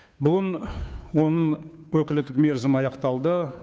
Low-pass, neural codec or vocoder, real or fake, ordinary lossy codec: none; codec, 16 kHz, 2 kbps, FunCodec, trained on Chinese and English, 25 frames a second; fake; none